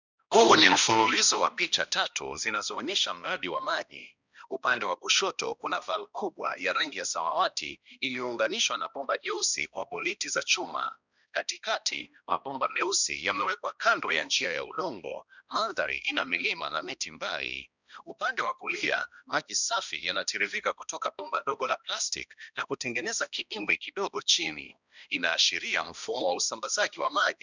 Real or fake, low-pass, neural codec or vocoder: fake; 7.2 kHz; codec, 16 kHz, 1 kbps, X-Codec, HuBERT features, trained on balanced general audio